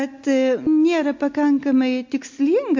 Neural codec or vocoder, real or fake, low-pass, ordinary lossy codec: none; real; 7.2 kHz; MP3, 48 kbps